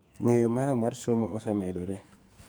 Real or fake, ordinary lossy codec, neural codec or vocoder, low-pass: fake; none; codec, 44.1 kHz, 2.6 kbps, SNAC; none